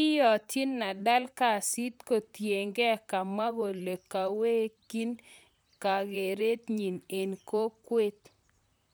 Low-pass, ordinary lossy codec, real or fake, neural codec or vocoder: none; none; fake; vocoder, 44.1 kHz, 128 mel bands, Pupu-Vocoder